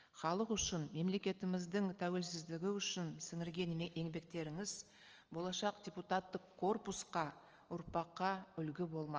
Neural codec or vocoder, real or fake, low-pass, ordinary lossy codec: none; real; 7.2 kHz; Opus, 24 kbps